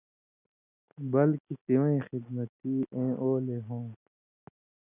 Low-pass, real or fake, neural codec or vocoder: 3.6 kHz; real; none